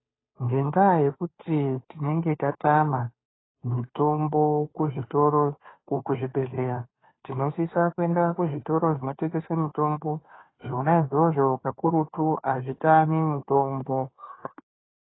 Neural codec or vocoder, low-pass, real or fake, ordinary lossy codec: codec, 16 kHz, 2 kbps, FunCodec, trained on Chinese and English, 25 frames a second; 7.2 kHz; fake; AAC, 16 kbps